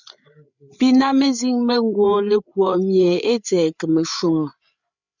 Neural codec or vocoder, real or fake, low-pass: codec, 16 kHz, 8 kbps, FreqCodec, larger model; fake; 7.2 kHz